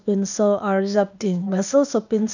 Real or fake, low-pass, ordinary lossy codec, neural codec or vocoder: fake; 7.2 kHz; none; codec, 16 kHz, 0.8 kbps, ZipCodec